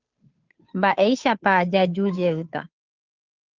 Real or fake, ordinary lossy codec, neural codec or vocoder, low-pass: fake; Opus, 24 kbps; codec, 16 kHz, 2 kbps, FunCodec, trained on Chinese and English, 25 frames a second; 7.2 kHz